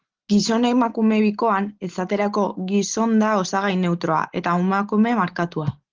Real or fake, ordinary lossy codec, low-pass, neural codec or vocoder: real; Opus, 16 kbps; 7.2 kHz; none